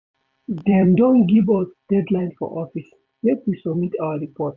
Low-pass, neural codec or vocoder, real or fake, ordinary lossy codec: 7.2 kHz; vocoder, 44.1 kHz, 128 mel bands every 256 samples, BigVGAN v2; fake; none